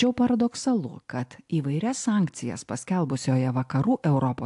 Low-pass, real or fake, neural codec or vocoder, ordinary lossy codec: 10.8 kHz; real; none; AAC, 64 kbps